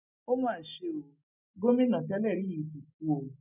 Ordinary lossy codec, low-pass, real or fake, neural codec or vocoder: none; 3.6 kHz; real; none